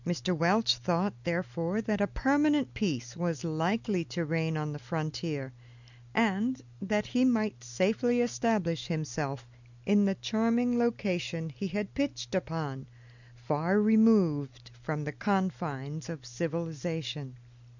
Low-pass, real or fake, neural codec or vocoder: 7.2 kHz; real; none